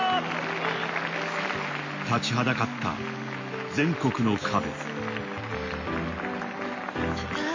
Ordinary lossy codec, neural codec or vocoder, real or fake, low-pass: MP3, 32 kbps; none; real; 7.2 kHz